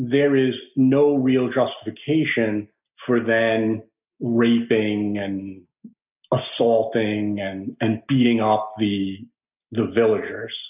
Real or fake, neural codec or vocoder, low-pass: real; none; 3.6 kHz